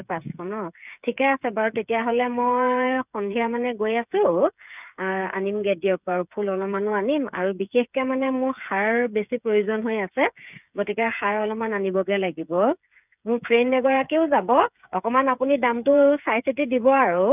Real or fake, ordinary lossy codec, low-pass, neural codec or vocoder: fake; none; 3.6 kHz; codec, 16 kHz, 8 kbps, FreqCodec, smaller model